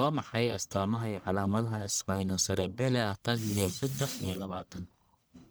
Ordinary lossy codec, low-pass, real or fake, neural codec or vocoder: none; none; fake; codec, 44.1 kHz, 1.7 kbps, Pupu-Codec